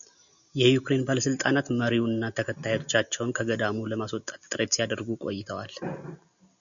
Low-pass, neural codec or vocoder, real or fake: 7.2 kHz; none; real